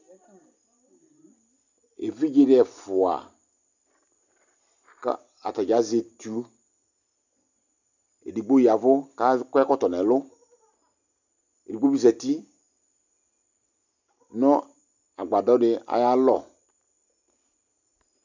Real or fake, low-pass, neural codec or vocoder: real; 7.2 kHz; none